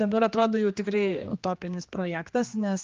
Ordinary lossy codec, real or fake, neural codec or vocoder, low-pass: Opus, 24 kbps; fake; codec, 16 kHz, 2 kbps, X-Codec, HuBERT features, trained on general audio; 7.2 kHz